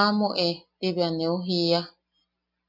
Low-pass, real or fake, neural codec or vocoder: 5.4 kHz; real; none